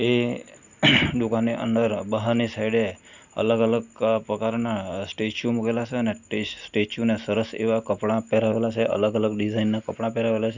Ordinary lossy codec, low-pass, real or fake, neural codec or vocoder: Opus, 64 kbps; 7.2 kHz; real; none